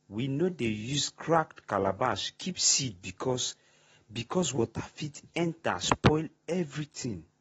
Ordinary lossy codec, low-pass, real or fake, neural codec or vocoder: AAC, 24 kbps; 19.8 kHz; real; none